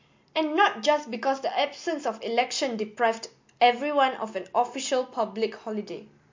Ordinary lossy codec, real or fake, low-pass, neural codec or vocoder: MP3, 48 kbps; real; 7.2 kHz; none